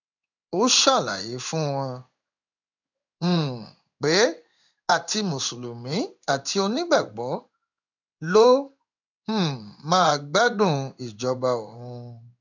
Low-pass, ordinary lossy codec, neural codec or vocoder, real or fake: 7.2 kHz; none; codec, 16 kHz in and 24 kHz out, 1 kbps, XY-Tokenizer; fake